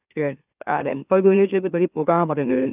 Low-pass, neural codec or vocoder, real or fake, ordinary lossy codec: 3.6 kHz; autoencoder, 44.1 kHz, a latent of 192 numbers a frame, MeloTTS; fake; none